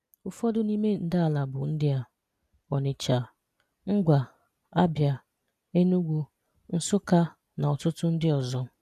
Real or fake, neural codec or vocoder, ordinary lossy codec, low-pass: real; none; none; 14.4 kHz